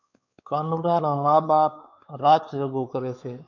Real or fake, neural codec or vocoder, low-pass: fake; codec, 16 kHz, 4 kbps, X-Codec, WavLM features, trained on Multilingual LibriSpeech; 7.2 kHz